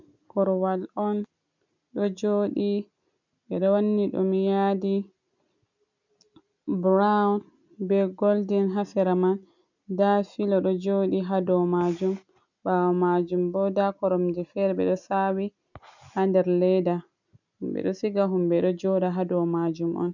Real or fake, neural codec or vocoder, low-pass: real; none; 7.2 kHz